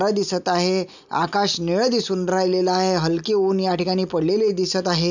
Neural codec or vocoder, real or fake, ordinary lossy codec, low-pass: none; real; none; 7.2 kHz